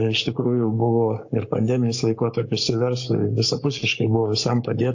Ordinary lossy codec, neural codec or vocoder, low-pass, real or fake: AAC, 48 kbps; codec, 44.1 kHz, 7.8 kbps, Pupu-Codec; 7.2 kHz; fake